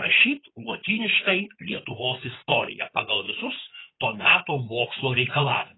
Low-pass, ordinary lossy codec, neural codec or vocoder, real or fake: 7.2 kHz; AAC, 16 kbps; codec, 16 kHz in and 24 kHz out, 2.2 kbps, FireRedTTS-2 codec; fake